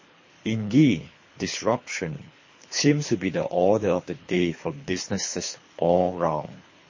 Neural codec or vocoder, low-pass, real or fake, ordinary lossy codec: codec, 24 kHz, 3 kbps, HILCodec; 7.2 kHz; fake; MP3, 32 kbps